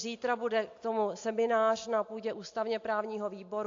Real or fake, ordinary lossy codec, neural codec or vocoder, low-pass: real; AAC, 48 kbps; none; 7.2 kHz